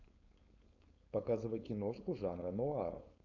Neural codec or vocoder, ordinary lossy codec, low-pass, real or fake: codec, 16 kHz, 4.8 kbps, FACodec; MP3, 64 kbps; 7.2 kHz; fake